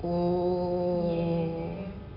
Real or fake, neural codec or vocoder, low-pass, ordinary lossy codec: real; none; 5.4 kHz; none